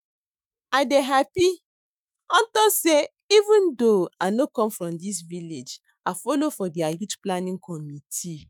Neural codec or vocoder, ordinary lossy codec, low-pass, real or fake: autoencoder, 48 kHz, 128 numbers a frame, DAC-VAE, trained on Japanese speech; none; none; fake